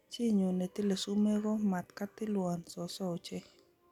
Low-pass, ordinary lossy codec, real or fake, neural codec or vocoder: 19.8 kHz; none; real; none